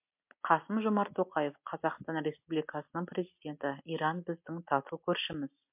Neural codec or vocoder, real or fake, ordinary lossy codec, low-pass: none; real; MP3, 32 kbps; 3.6 kHz